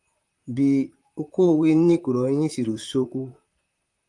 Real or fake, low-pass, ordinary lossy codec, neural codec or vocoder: fake; 10.8 kHz; Opus, 32 kbps; vocoder, 44.1 kHz, 128 mel bands, Pupu-Vocoder